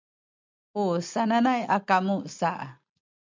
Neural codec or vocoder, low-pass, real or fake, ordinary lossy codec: none; 7.2 kHz; real; MP3, 64 kbps